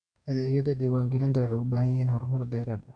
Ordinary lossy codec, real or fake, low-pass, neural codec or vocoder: none; fake; 9.9 kHz; codec, 44.1 kHz, 2.6 kbps, DAC